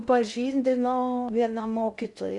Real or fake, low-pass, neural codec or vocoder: fake; 10.8 kHz; codec, 16 kHz in and 24 kHz out, 0.6 kbps, FocalCodec, streaming, 2048 codes